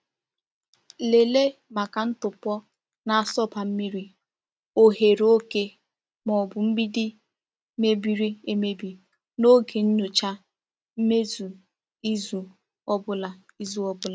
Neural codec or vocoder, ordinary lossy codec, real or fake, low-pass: none; none; real; none